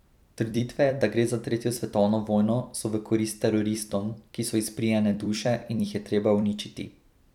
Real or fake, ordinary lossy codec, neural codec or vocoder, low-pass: fake; none; vocoder, 44.1 kHz, 128 mel bands every 256 samples, BigVGAN v2; 19.8 kHz